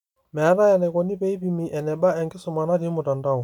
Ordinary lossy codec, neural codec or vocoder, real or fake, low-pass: none; none; real; 19.8 kHz